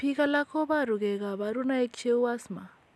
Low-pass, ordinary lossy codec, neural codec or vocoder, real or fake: none; none; none; real